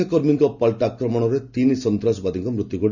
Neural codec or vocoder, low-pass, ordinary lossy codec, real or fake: none; 7.2 kHz; none; real